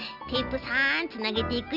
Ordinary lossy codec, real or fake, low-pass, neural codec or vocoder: none; real; 5.4 kHz; none